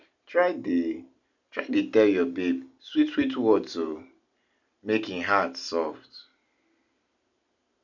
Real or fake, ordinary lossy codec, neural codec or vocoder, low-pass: real; none; none; 7.2 kHz